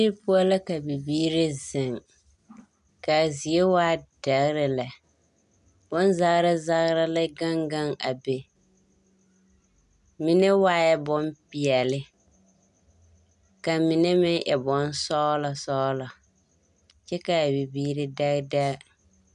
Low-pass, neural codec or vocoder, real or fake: 10.8 kHz; none; real